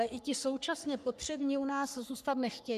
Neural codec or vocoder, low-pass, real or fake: codec, 44.1 kHz, 3.4 kbps, Pupu-Codec; 14.4 kHz; fake